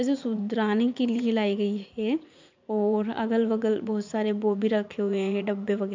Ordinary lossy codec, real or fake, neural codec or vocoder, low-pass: MP3, 64 kbps; fake; vocoder, 44.1 kHz, 80 mel bands, Vocos; 7.2 kHz